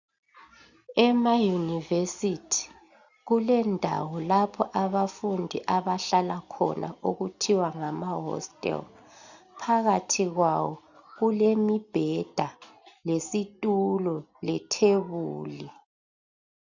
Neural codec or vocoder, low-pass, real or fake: none; 7.2 kHz; real